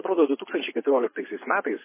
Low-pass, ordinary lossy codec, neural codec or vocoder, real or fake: 3.6 kHz; MP3, 16 kbps; vocoder, 24 kHz, 100 mel bands, Vocos; fake